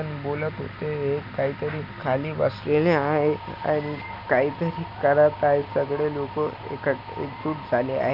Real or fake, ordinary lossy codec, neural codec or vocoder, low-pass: real; none; none; 5.4 kHz